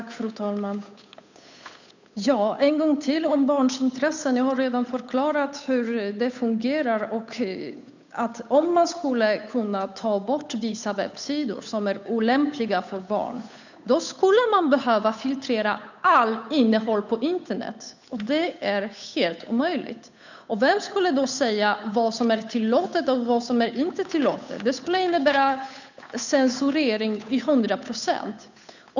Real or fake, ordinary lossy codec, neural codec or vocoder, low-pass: fake; none; codec, 16 kHz, 8 kbps, FunCodec, trained on Chinese and English, 25 frames a second; 7.2 kHz